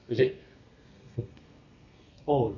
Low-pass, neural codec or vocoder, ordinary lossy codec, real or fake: 7.2 kHz; codec, 32 kHz, 1.9 kbps, SNAC; none; fake